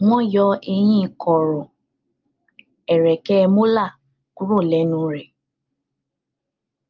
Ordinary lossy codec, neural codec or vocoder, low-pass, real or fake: Opus, 32 kbps; none; 7.2 kHz; real